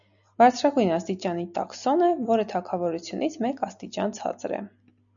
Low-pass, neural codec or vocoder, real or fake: 7.2 kHz; none; real